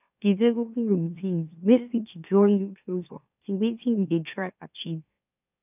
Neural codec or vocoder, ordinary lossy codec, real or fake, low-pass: autoencoder, 44.1 kHz, a latent of 192 numbers a frame, MeloTTS; none; fake; 3.6 kHz